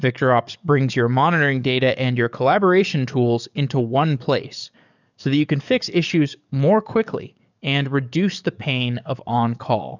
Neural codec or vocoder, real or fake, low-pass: codec, 44.1 kHz, 7.8 kbps, DAC; fake; 7.2 kHz